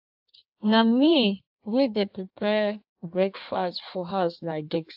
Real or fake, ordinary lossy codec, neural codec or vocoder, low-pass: fake; AAC, 48 kbps; codec, 16 kHz in and 24 kHz out, 1.1 kbps, FireRedTTS-2 codec; 5.4 kHz